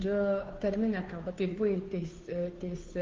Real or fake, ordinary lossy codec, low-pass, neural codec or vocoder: fake; Opus, 32 kbps; 7.2 kHz; codec, 16 kHz, 2 kbps, FunCodec, trained on Chinese and English, 25 frames a second